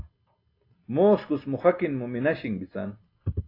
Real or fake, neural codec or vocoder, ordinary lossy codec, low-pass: real; none; AAC, 32 kbps; 5.4 kHz